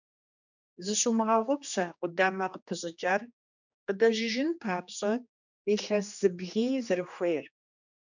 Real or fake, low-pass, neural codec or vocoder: fake; 7.2 kHz; codec, 16 kHz, 2 kbps, X-Codec, HuBERT features, trained on general audio